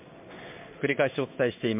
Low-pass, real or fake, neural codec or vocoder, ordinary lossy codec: 3.6 kHz; fake; codec, 16 kHz in and 24 kHz out, 1 kbps, XY-Tokenizer; MP3, 32 kbps